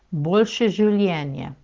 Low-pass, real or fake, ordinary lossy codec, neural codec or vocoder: 7.2 kHz; real; Opus, 16 kbps; none